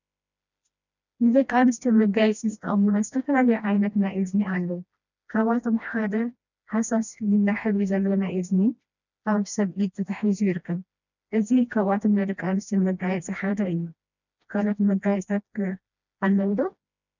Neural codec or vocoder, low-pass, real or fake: codec, 16 kHz, 1 kbps, FreqCodec, smaller model; 7.2 kHz; fake